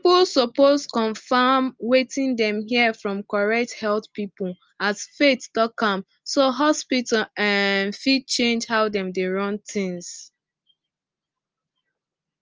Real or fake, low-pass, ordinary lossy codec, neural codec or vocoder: real; 7.2 kHz; Opus, 24 kbps; none